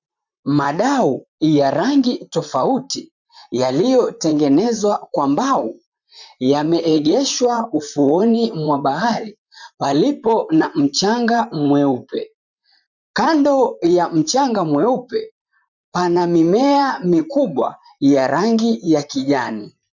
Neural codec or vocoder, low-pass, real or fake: vocoder, 44.1 kHz, 128 mel bands, Pupu-Vocoder; 7.2 kHz; fake